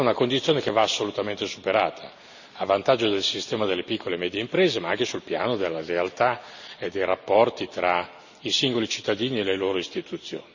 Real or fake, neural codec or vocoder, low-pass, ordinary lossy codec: real; none; 7.2 kHz; none